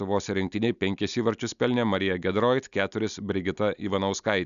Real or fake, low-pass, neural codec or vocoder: real; 7.2 kHz; none